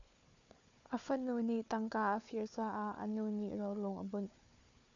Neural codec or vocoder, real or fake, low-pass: codec, 16 kHz, 4 kbps, FunCodec, trained on Chinese and English, 50 frames a second; fake; 7.2 kHz